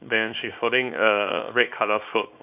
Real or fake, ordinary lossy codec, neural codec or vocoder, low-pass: fake; none; codec, 24 kHz, 0.9 kbps, WavTokenizer, small release; 3.6 kHz